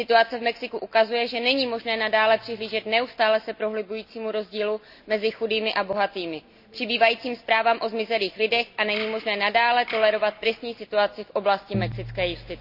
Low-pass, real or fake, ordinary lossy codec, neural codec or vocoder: 5.4 kHz; real; none; none